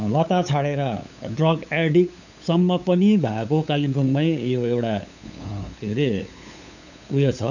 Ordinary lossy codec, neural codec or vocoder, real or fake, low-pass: none; codec, 16 kHz, 8 kbps, FunCodec, trained on LibriTTS, 25 frames a second; fake; 7.2 kHz